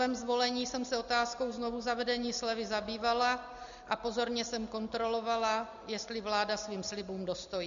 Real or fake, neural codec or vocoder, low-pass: real; none; 7.2 kHz